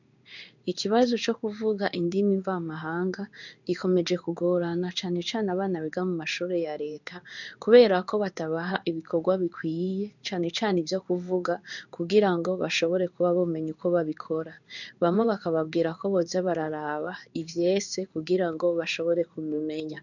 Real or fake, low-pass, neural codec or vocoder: fake; 7.2 kHz; codec, 16 kHz in and 24 kHz out, 1 kbps, XY-Tokenizer